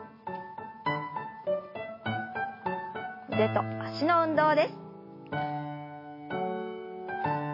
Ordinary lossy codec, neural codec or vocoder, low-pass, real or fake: MP3, 24 kbps; none; 5.4 kHz; real